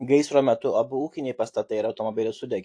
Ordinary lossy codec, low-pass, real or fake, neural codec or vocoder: AAC, 48 kbps; 9.9 kHz; real; none